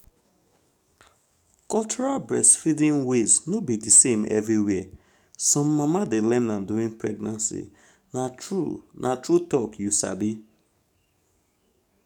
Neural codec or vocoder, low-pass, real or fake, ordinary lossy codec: codec, 44.1 kHz, 7.8 kbps, DAC; 19.8 kHz; fake; none